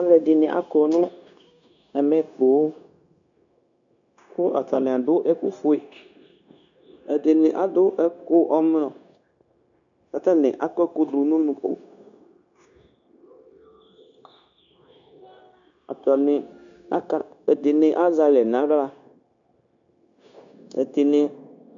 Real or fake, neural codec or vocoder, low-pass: fake; codec, 16 kHz, 0.9 kbps, LongCat-Audio-Codec; 7.2 kHz